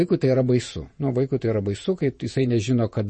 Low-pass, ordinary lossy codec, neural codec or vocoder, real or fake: 10.8 kHz; MP3, 32 kbps; vocoder, 48 kHz, 128 mel bands, Vocos; fake